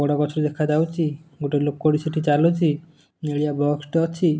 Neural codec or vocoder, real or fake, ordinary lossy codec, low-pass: none; real; none; none